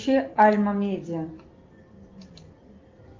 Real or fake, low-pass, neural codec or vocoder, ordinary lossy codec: real; 7.2 kHz; none; Opus, 32 kbps